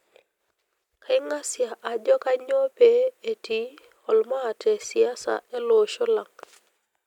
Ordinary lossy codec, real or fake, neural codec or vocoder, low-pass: none; real; none; 19.8 kHz